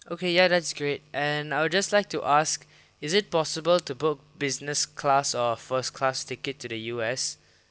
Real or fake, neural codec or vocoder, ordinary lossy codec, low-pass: real; none; none; none